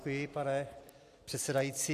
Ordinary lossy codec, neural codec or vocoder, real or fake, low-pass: AAC, 64 kbps; none; real; 14.4 kHz